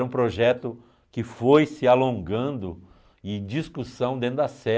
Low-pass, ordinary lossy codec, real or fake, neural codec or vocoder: none; none; real; none